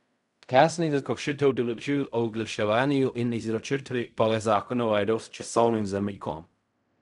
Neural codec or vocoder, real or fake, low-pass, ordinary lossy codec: codec, 16 kHz in and 24 kHz out, 0.4 kbps, LongCat-Audio-Codec, fine tuned four codebook decoder; fake; 10.8 kHz; none